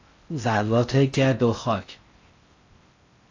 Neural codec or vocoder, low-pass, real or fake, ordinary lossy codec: codec, 16 kHz in and 24 kHz out, 0.6 kbps, FocalCodec, streaming, 4096 codes; 7.2 kHz; fake; AAC, 48 kbps